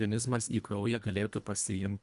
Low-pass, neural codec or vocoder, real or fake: 10.8 kHz; codec, 24 kHz, 1.5 kbps, HILCodec; fake